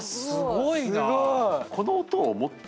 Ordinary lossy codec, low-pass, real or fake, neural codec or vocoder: none; none; real; none